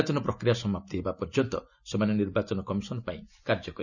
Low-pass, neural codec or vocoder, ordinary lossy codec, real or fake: 7.2 kHz; none; none; real